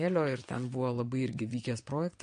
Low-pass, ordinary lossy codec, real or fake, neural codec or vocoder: 9.9 kHz; MP3, 48 kbps; fake; vocoder, 22.05 kHz, 80 mel bands, WaveNeXt